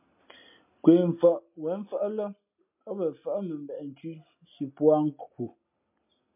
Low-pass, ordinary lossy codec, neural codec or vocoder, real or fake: 3.6 kHz; MP3, 32 kbps; none; real